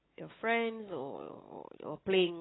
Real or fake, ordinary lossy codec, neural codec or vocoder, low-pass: real; AAC, 16 kbps; none; 7.2 kHz